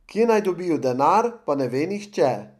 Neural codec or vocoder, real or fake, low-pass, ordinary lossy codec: none; real; 14.4 kHz; none